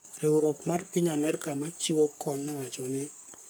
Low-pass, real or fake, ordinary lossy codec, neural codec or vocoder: none; fake; none; codec, 44.1 kHz, 3.4 kbps, Pupu-Codec